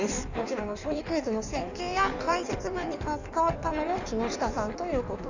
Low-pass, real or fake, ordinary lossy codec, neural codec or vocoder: 7.2 kHz; fake; none; codec, 16 kHz in and 24 kHz out, 1.1 kbps, FireRedTTS-2 codec